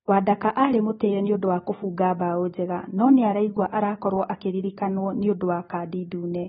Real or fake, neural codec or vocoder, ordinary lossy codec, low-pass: real; none; AAC, 16 kbps; 19.8 kHz